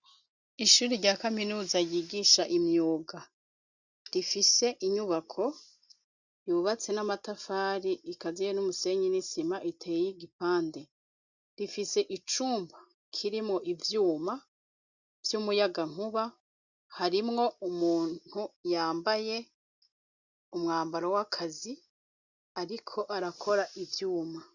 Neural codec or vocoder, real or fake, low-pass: none; real; 7.2 kHz